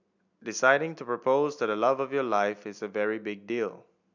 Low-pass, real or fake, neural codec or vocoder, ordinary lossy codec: 7.2 kHz; real; none; none